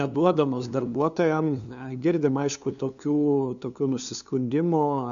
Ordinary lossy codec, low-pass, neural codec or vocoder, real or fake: AAC, 96 kbps; 7.2 kHz; codec, 16 kHz, 2 kbps, FunCodec, trained on LibriTTS, 25 frames a second; fake